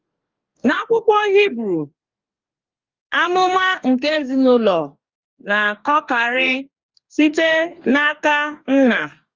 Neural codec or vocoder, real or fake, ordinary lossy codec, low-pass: codec, 44.1 kHz, 2.6 kbps, DAC; fake; Opus, 32 kbps; 7.2 kHz